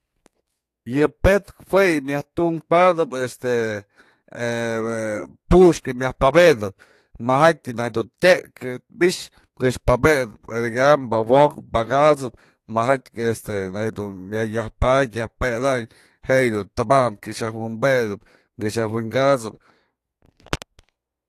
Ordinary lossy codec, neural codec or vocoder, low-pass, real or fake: AAC, 64 kbps; codec, 32 kHz, 1.9 kbps, SNAC; 14.4 kHz; fake